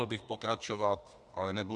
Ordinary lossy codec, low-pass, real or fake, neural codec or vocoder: Opus, 64 kbps; 10.8 kHz; fake; codec, 32 kHz, 1.9 kbps, SNAC